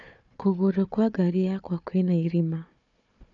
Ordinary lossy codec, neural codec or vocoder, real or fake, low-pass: none; codec, 16 kHz, 4 kbps, FunCodec, trained on Chinese and English, 50 frames a second; fake; 7.2 kHz